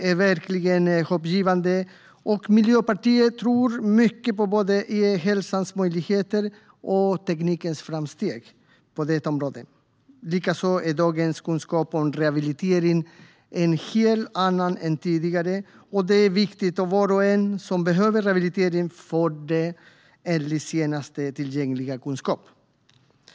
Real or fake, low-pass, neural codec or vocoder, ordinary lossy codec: real; none; none; none